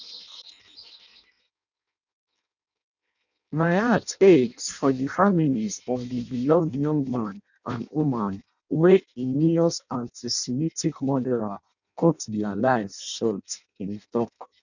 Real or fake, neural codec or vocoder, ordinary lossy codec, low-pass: fake; codec, 16 kHz in and 24 kHz out, 0.6 kbps, FireRedTTS-2 codec; none; 7.2 kHz